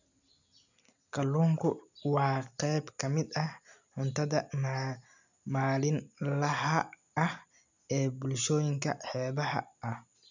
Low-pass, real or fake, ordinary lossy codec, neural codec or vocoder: 7.2 kHz; real; none; none